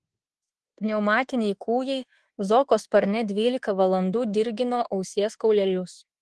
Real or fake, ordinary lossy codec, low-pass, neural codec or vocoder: fake; Opus, 16 kbps; 10.8 kHz; codec, 24 kHz, 1.2 kbps, DualCodec